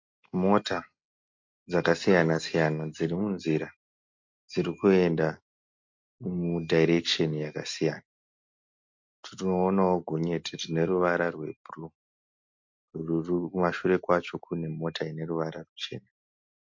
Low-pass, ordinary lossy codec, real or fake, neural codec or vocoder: 7.2 kHz; MP3, 48 kbps; real; none